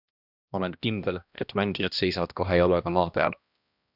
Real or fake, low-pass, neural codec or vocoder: fake; 5.4 kHz; codec, 16 kHz, 1 kbps, X-Codec, HuBERT features, trained on balanced general audio